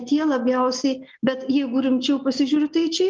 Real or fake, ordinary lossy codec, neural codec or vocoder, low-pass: real; Opus, 24 kbps; none; 7.2 kHz